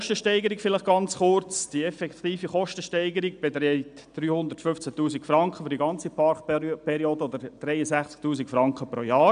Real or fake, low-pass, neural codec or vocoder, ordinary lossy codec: real; 9.9 kHz; none; none